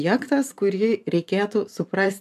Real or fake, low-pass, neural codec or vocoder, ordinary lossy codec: fake; 14.4 kHz; vocoder, 44.1 kHz, 128 mel bands every 512 samples, BigVGAN v2; AAC, 96 kbps